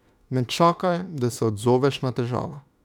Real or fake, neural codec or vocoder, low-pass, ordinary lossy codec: fake; autoencoder, 48 kHz, 32 numbers a frame, DAC-VAE, trained on Japanese speech; 19.8 kHz; none